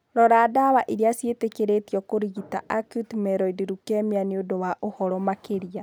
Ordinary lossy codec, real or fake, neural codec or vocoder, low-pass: none; real; none; none